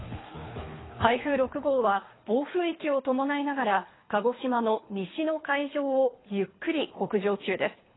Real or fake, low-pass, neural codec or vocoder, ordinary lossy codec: fake; 7.2 kHz; codec, 24 kHz, 3 kbps, HILCodec; AAC, 16 kbps